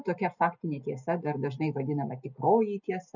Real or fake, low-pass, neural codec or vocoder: real; 7.2 kHz; none